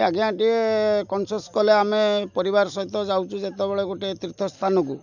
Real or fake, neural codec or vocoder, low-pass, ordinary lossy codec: real; none; 7.2 kHz; none